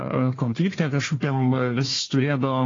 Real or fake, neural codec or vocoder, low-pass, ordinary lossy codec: fake; codec, 16 kHz, 1 kbps, FunCodec, trained on Chinese and English, 50 frames a second; 7.2 kHz; AAC, 32 kbps